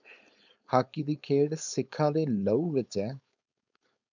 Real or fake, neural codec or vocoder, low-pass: fake; codec, 16 kHz, 4.8 kbps, FACodec; 7.2 kHz